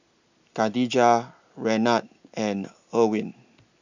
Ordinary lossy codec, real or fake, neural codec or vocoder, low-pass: none; real; none; 7.2 kHz